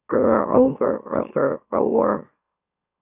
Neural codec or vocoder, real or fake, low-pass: autoencoder, 44.1 kHz, a latent of 192 numbers a frame, MeloTTS; fake; 3.6 kHz